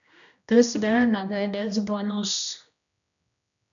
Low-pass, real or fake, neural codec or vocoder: 7.2 kHz; fake; codec, 16 kHz, 1 kbps, X-Codec, HuBERT features, trained on general audio